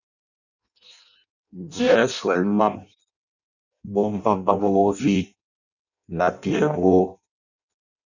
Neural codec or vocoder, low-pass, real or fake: codec, 16 kHz in and 24 kHz out, 0.6 kbps, FireRedTTS-2 codec; 7.2 kHz; fake